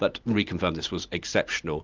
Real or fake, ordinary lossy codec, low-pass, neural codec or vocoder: real; Opus, 24 kbps; 7.2 kHz; none